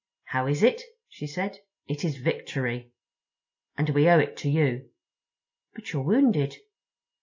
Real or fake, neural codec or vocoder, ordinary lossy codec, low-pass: real; none; AAC, 48 kbps; 7.2 kHz